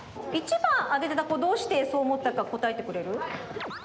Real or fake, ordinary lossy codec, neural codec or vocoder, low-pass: real; none; none; none